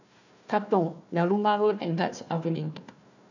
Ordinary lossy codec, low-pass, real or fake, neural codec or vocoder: none; 7.2 kHz; fake; codec, 16 kHz, 1 kbps, FunCodec, trained on Chinese and English, 50 frames a second